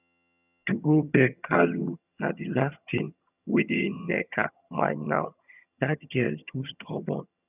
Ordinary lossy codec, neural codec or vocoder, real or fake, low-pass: none; vocoder, 22.05 kHz, 80 mel bands, HiFi-GAN; fake; 3.6 kHz